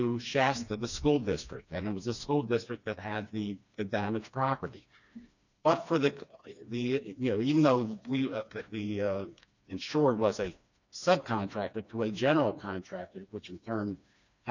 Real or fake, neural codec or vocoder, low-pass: fake; codec, 16 kHz, 2 kbps, FreqCodec, smaller model; 7.2 kHz